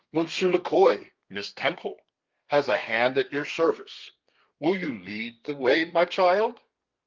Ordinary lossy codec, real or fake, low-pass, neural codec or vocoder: Opus, 32 kbps; fake; 7.2 kHz; codec, 32 kHz, 1.9 kbps, SNAC